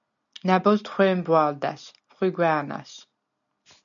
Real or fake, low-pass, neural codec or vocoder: real; 7.2 kHz; none